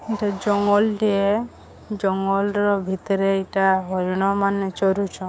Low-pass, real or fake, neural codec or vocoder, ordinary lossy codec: none; fake; codec, 16 kHz, 6 kbps, DAC; none